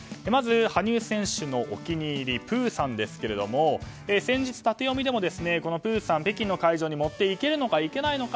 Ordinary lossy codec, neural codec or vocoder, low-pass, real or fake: none; none; none; real